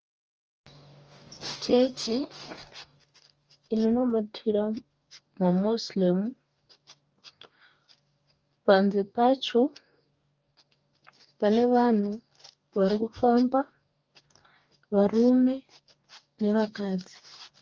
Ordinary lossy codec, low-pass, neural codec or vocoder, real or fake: Opus, 24 kbps; 7.2 kHz; codec, 44.1 kHz, 2.6 kbps, DAC; fake